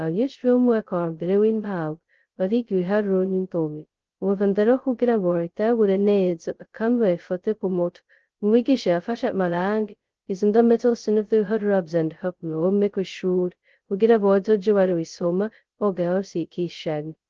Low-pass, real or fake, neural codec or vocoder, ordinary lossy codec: 7.2 kHz; fake; codec, 16 kHz, 0.2 kbps, FocalCodec; Opus, 16 kbps